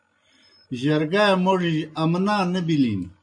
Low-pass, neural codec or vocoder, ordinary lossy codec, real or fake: 9.9 kHz; none; MP3, 48 kbps; real